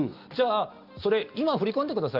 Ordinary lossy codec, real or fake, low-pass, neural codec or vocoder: Opus, 32 kbps; real; 5.4 kHz; none